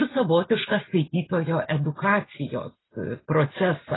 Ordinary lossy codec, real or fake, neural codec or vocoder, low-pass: AAC, 16 kbps; real; none; 7.2 kHz